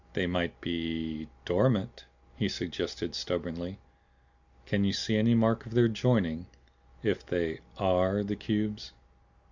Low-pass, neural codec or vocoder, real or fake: 7.2 kHz; none; real